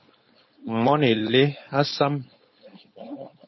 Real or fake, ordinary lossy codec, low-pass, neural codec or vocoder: fake; MP3, 24 kbps; 7.2 kHz; codec, 16 kHz, 4.8 kbps, FACodec